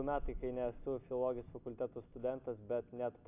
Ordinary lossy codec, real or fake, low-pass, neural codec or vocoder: AAC, 32 kbps; real; 3.6 kHz; none